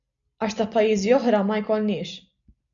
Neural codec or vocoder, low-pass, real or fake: none; 7.2 kHz; real